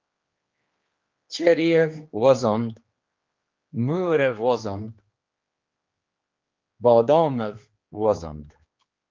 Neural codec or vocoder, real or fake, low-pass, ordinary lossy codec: codec, 16 kHz, 2 kbps, X-Codec, HuBERT features, trained on general audio; fake; 7.2 kHz; Opus, 24 kbps